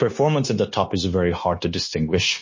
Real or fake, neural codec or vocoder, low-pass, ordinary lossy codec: fake; codec, 16 kHz, 0.9 kbps, LongCat-Audio-Codec; 7.2 kHz; MP3, 32 kbps